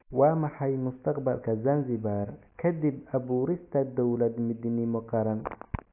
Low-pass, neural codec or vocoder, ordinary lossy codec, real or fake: 3.6 kHz; none; AAC, 32 kbps; real